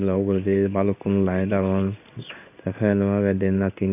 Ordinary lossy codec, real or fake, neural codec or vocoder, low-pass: none; fake; codec, 16 kHz in and 24 kHz out, 1 kbps, XY-Tokenizer; 3.6 kHz